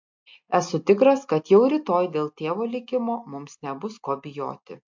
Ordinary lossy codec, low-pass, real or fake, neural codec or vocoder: MP3, 48 kbps; 7.2 kHz; real; none